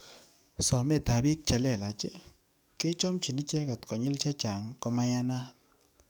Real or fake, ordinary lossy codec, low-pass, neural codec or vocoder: fake; none; none; codec, 44.1 kHz, 7.8 kbps, DAC